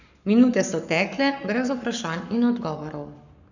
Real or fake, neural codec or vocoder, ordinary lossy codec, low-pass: fake; codec, 44.1 kHz, 7.8 kbps, Pupu-Codec; none; 7.2 kHz